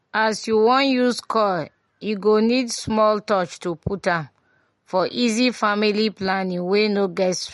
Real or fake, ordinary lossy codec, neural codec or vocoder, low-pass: real; MP3, 48 kbps; none; 19.8 kHz